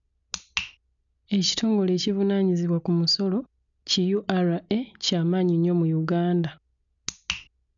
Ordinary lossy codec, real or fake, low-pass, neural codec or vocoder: none; real; 7.2 kHz; none